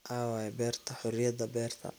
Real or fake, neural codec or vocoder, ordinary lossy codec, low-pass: real; none; none; none